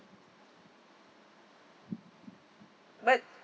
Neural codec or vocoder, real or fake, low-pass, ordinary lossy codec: none; real; none; none